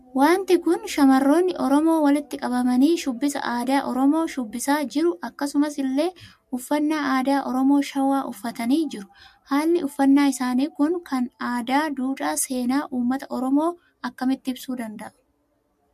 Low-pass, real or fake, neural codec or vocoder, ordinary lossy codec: 14.4 kHz; real; none; MP3, 64 kbps